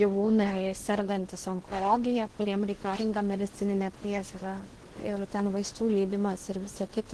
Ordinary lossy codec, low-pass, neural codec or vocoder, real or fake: Opus, 16 kbps; 10.8 kHz; codec, 16 kHz in and 24 kHz out, 0.8 kbps, FocalCodec, streaming, 65536 codes; fake